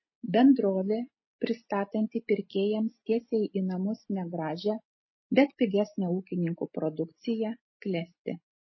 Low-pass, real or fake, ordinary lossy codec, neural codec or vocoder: 7.2 kHz; real; MP3, 24 kbps; none